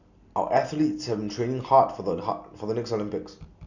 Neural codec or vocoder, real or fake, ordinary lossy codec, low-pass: none; real; none; 7.2 kHz